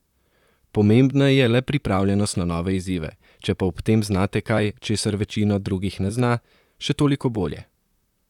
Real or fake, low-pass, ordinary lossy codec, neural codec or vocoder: fake; 19.8 kHz; none; vocoder, 44.1 kHz, 128 mel bands, Pupu-Vocoder